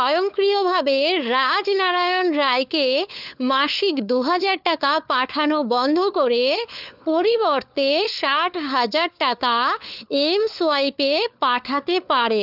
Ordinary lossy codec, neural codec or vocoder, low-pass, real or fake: none; codec, 16 kHz, 4 kbps, FunCodec, trained on LibriTTS, 50 frames a second; 5.4 kHz; fake